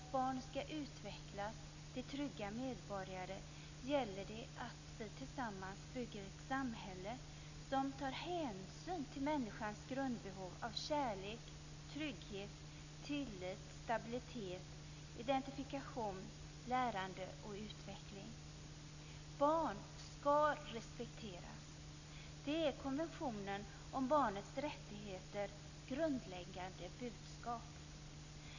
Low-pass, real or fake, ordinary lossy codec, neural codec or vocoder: 7.2 kHz; real; none; none